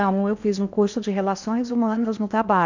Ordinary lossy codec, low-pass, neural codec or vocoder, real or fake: none; 7.2 kHz; codec, 16 kHz in and 24 kHz out, 0.8 kbps, FocalCodec, streaming, 65536 codes; fake